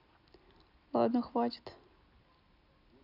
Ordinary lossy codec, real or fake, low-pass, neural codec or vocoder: none; real; 5.4 kHz; none